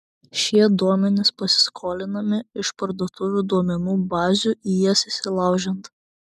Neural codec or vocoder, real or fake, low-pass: none; real; 14.4 kHz